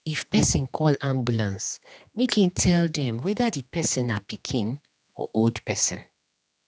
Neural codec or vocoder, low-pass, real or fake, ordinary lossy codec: codec, 16 kHz, 2 kbps, X-Codec, HuBERT features, trained on general audio; none; fake; none